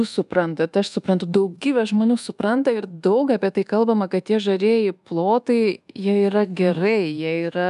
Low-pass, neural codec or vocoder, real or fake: 10.8 kHz; codec, 24 kHz, 1.2 kbps, DualCodec; fake